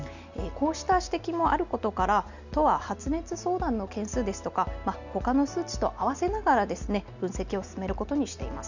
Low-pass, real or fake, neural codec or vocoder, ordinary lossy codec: 7.2 kHz; real; none; none